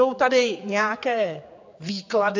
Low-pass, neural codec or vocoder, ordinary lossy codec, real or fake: 7.2 kHz; codec, 16 kHz, 4 kbps, X-Codec, HuBERT features, trained on general audio; AAC, 48 kbps; fake